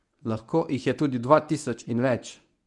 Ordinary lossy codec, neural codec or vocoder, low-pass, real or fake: none; codec, 24 kHz, 0.9 kbps, WavTokenizer, medium speech release version 1; 10.8 kHz; fake